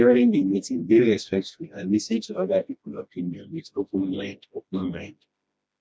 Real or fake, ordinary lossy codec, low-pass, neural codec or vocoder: fake; none; none; codec, 16 kHz, 1 kbps, FreqCodec, smaller model